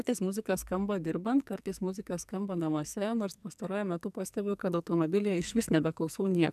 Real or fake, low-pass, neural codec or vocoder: fake; 14.4 kHz; codec, 44.1 kHz, 2.6 kbps, SNAC